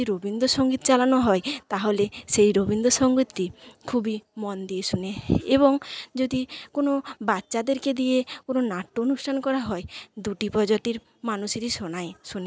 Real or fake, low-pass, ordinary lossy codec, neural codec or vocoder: real; none; none; none